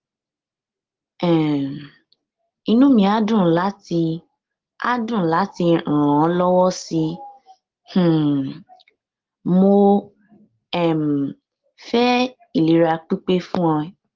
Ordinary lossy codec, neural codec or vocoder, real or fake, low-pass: Opus, 16 kbps; none; real; 7.2 kHz